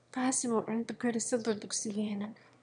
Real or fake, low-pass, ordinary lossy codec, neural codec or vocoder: fake; 9.9 kHz; none; autoencoder, 22.05 kHz, a latent of 192 numbers a frame, VITS, trained on one speaker